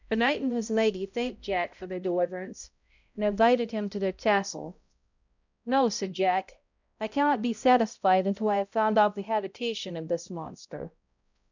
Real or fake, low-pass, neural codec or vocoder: fake; 7.2 kHz; codec, 16 kHz, 0.5 kbps, X-Codec, HuBERT features, trained on balanced general audio